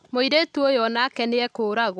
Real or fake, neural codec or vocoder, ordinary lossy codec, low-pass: real; none; none; none